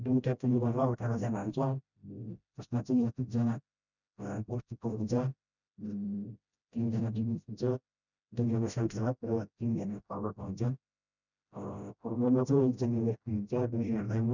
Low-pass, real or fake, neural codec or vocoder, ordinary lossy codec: 7.2 kHz; fake; codec, 16 kHz, 0.5 kbps, FreqCodec, smaller model; none